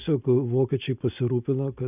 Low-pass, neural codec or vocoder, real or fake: 3.6 kHz; none; real